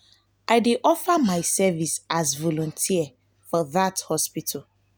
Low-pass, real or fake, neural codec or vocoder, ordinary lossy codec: none; real; none; none